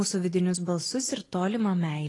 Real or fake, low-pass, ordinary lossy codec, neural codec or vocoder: real; 10.8 kHz; AAC, 32 kbps; none